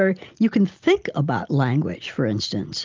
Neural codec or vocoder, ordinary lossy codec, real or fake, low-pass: none; Opus, 24 kbps; real; 7.2 kHz